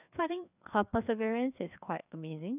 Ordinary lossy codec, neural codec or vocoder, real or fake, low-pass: none; codec, 16 kHz, 2 kbps, FreqCodec, larger model; fake; 3.6 kHz